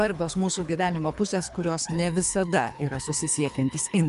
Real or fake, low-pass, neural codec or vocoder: fake; 10.8 kHz; codec, 24 kHz, 3 kbps, HILCodec